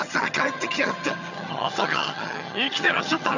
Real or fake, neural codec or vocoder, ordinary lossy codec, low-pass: fake; vocoder, 22.05 kHz, 80 mel bands, HiFi-GAN; none; 7.2 kHz